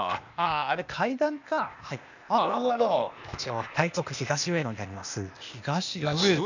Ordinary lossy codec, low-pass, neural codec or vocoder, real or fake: none; 7.2 kHz; codec, 16 kHz, 0.8 kbps, ZipCodec; fake